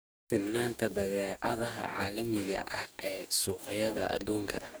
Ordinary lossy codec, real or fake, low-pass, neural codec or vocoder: none; fake; none; codec, 44.1 kHz, 2.6 kbps, DAC